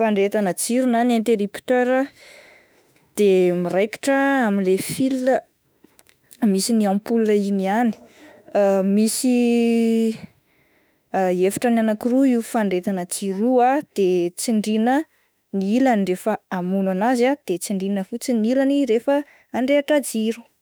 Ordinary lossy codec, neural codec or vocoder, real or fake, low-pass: none; autoencoder, 48 kHz, 32 numbers a frame, DAC-VAE, trained on Japanese speech; fake; none